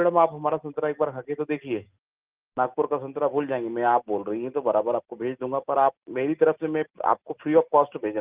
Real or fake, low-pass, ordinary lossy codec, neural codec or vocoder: real; 3.6 kHz; Opus, 32 kbps; none